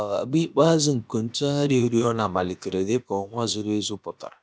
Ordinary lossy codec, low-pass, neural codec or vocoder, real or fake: none; none; codec, 16 kHz, about 1 kbps, DyCAST, with the encoder's durations; fake